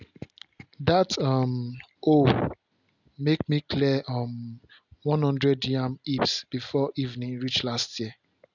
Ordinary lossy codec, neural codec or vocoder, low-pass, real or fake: none; none; 7.2 kHz; real